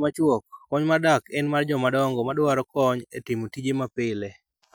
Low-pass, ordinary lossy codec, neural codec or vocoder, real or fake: none; none; none; real